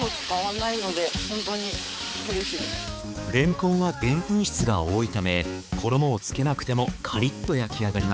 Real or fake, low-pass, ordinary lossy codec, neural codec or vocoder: fake; none; none; codec, 16 kHz, 4 kbps, X-Codec, HuBERT features, trained on balanced general audio